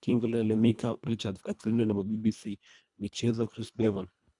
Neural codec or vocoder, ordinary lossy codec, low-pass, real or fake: codec, 24 kHz, 1.5 kbps, HILCodec; none; 10.8 kHz; fake